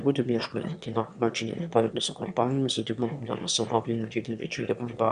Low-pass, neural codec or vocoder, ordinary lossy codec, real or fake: 9.9 kHz; autoencoder, 22.05 kHz, a latent of 192 numbers a frame, VITS, trained on one speaker; Opus, 64 kbps; fake